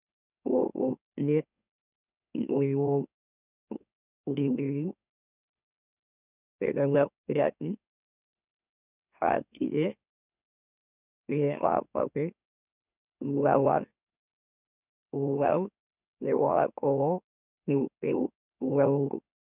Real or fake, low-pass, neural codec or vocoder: fake; 3.6 kHz; autoencoder, 44.1 kHz, a latent of 192 numbers a frame, MeloTTS